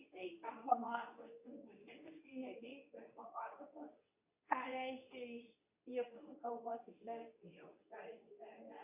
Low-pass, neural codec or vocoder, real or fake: 3.6 kHz; codec, 24 kHz, 0.9 kbps, WavTokenizer, medium speech release version 2; fake